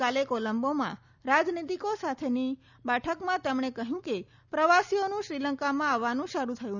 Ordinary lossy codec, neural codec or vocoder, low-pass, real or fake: none; none; 7.2 kHz; real